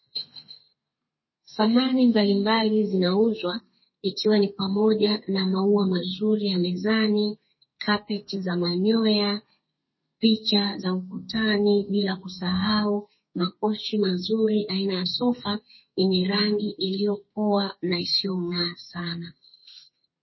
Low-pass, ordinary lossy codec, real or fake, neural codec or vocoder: 7.2 kHz; MP3, 24 kbps; fake; codec, 32 kHz, 1.9 kbps, SNAC